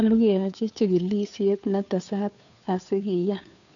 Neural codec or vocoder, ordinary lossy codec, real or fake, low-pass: codec, 16 kHz, 2 kbps, FunCodec, trained on Chinese and English, 25 frames a second; none; fake; 7.2 kHz